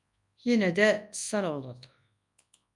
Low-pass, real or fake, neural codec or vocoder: 10.8 kHz; fake; codec, 24 kHz, 0.9 kbps, WavTokenizer, large speech release